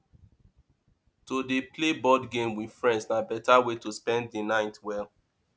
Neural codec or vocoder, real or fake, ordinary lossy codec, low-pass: none; real; none; none